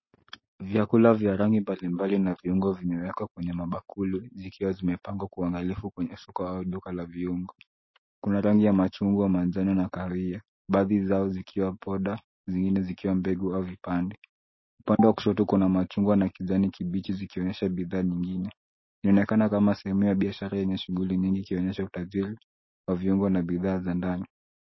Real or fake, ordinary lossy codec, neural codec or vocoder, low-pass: real; MP3, 24 kbps; none; 7.2 kHz